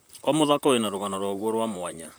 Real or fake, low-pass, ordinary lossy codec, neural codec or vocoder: fake; none; none; vocoder, 44.1 kHz, 128 mel bands, Pupu-Vocoder